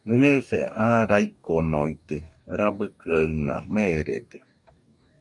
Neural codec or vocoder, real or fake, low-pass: codec, 44.1 kHz, 2.6 kbps, DAC; fake; 10.8 kHz